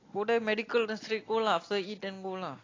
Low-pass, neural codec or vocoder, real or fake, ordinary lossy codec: 7.2 kHz; none; real; AAC, 32 kbps